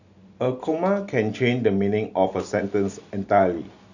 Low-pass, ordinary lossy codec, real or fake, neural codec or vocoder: 7.2 kHz; none; real; none